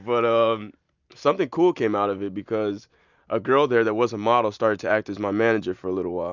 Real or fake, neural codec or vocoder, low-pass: real; none; 7.2 kHz